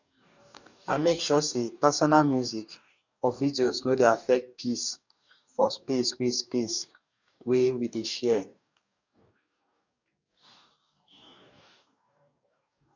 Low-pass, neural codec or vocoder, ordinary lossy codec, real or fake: 7.2 kHz; codec, 44.1 kHz, 2.6 kbps, DAC; none; fake